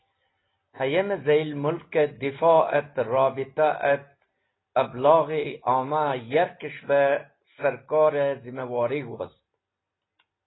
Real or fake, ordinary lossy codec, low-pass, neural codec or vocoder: real; AAC, 16 kbps; 7.2 kHz; none